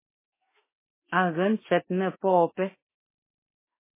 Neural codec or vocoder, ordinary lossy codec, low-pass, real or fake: autoencoder, 48 kHz, 32 numbers a frame, DAC-VAE, trained on Japanese speech; MP3, 16 kbps; 3.6 kHz; fake